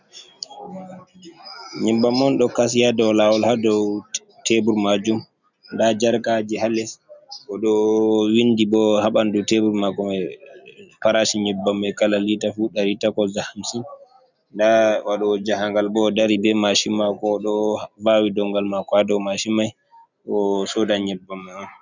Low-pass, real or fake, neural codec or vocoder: 7.2 kHz; real; none